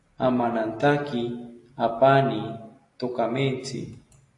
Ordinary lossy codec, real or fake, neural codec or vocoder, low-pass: AAC, 48 kbps; real; none; 10.8 kHz